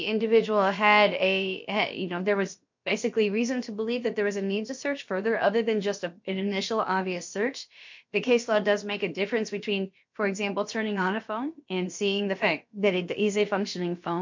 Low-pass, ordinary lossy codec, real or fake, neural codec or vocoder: 7.2 kHz; MP3, 48 kbps; fake; codec, 16 kHz, about 1 kbps, DyCAST, with the encoder's durations